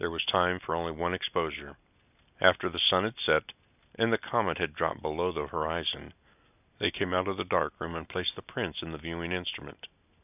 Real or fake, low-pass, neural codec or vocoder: real; 3.6 kHz; none